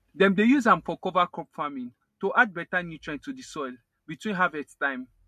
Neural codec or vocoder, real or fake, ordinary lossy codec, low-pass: none; real; MP3, 64 kbps; 14.4 kHz